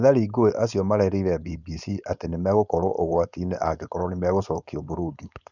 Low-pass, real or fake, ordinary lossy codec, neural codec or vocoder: 7.2 kHz; fake; none; codec, 16 kHz, 4.8 kbps, FACodec